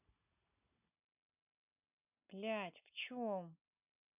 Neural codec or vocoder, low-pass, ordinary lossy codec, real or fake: none; 3.6 kHz; none; real